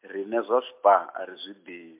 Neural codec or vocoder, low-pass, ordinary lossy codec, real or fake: none; 3.6 kHz; MP3, 32 kbps; real